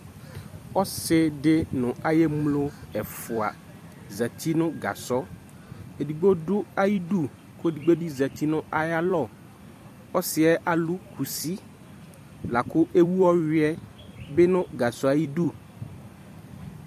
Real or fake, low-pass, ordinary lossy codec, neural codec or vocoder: fake; 14.4 kHz; AAC, 96 kbps; vocoder, 44.1 kHz, 128 mel bands every 256 samples, BigVGAN v2